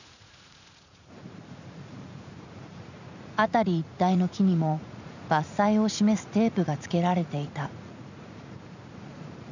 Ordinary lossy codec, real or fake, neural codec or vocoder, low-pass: none; fake; vocoder, 44.1 kHz, 80 mel bands, Vocos; 7.2 kHz